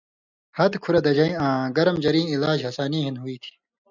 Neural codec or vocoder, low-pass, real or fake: none; 7.2 kHz; real